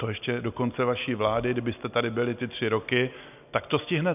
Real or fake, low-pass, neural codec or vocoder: real; 3.6 kHz; none